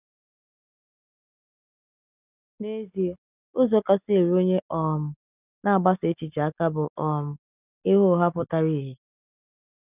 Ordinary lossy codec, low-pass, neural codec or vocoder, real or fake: none; 3.6 kHz; none; real